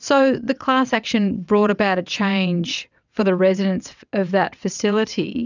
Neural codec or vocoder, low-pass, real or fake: vocoder, 22.05 kHz, 80 mel bands, WaveNeXt; 7.2 kHz; fake